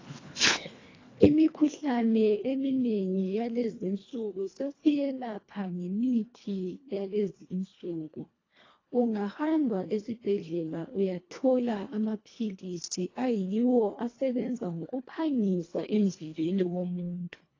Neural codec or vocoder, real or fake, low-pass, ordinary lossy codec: codec, 24 kHz, 1.5 kbps, HILCodec; fake; 7.2 kHz; AAC, 32 kbps